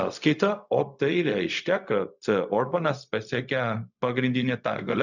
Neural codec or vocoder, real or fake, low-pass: codec, 16 kHz, 0.4 kbps, LongCat-Audio-Codec; fake; 7.2 kHz